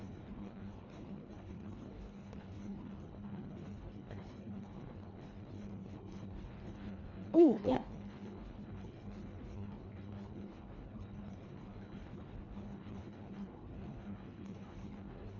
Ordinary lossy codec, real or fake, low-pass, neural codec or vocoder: none; fake; 7.2 kHz; codec, 24 kHz, 1.5 kbps, HILCodec